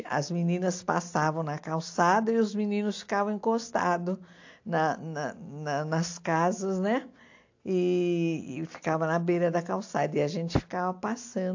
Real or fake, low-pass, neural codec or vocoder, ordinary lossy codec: real; 7.2 kHz; none; AAC, 48 kbps